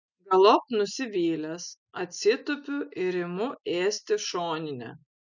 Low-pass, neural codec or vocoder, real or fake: 7.2 kHz; none; real